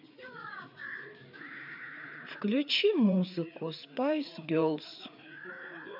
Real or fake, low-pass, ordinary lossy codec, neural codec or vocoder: fake; 5.4 kHz; none; codec, 16 kHz, 4 kbps, FreqCodec, larger model